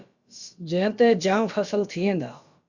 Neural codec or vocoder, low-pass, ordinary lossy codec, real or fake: codec, 16 kHz, about 1 kbps, DyCAST, with the encoder's durations; 7.2 kHz; Opus, 64 kbps; fake